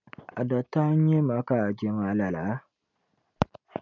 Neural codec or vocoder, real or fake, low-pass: none; real; 7.2 kHz